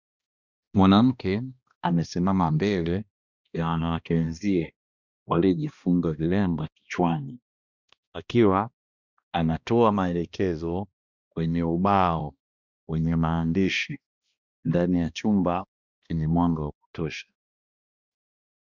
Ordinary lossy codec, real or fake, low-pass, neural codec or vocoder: Opus, 64 kbps; fake; 7.2 kHz; codec, 16 kHz, 1 kbps, X-Codec, HuBERT features, trained on balanced general audio